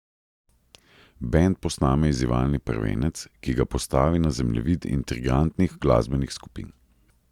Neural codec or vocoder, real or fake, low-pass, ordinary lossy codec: none; real; 19.8 kHz; Opus, 64 kbps